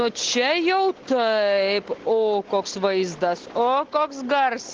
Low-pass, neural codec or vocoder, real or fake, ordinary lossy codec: 7.2 kHz; none; real; Opus, 16 kbps